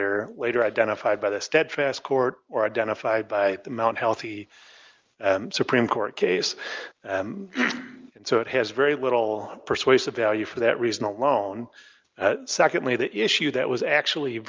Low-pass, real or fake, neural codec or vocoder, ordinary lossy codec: 7.2 kHz; real; none; Opus, 24 kbps